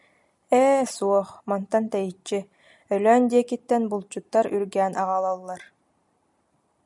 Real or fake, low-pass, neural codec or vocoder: real; 10.8 kHz; none